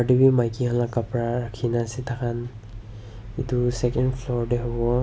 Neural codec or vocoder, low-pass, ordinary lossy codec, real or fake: none; none; none; real